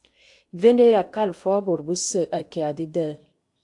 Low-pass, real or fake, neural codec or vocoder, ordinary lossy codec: 10.8 kHz; fake; codec, 16 kHz in and 24 kHz out, 0.8 kbps, FocalCodec, streaming, 65536 codes; AAC, 64 kbps